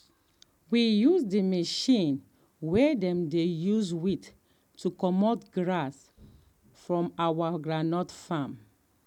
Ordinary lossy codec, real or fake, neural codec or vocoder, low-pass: none; real; none; 19.8 kHz